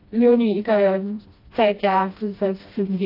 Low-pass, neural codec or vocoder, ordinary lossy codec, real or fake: 5.4 kHz; codec, 16 kHz, 1 kbps, FreqCodec, smaller model; AAC, 32 kbps; fake